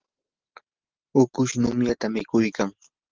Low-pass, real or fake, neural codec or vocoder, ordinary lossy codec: 7.2 kHz; real; none; Opus, 16 kbps